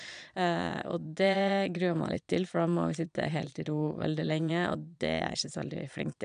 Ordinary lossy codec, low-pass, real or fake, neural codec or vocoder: none; 9.9 kHz; fake; vocoder, 22.05 kHz, 80 mel bands, Vocos